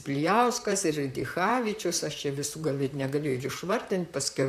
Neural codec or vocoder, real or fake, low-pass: vocoder, 44.1 kHz, 128 mel bands, Pupu-Vocoder; fake; 14.4 kHz